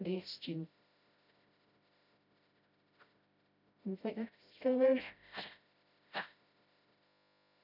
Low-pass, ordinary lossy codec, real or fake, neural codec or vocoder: 5.4 kHz; none; fake; codec, 16 kHz, 0.5 kbps, FreqCodec, smaller model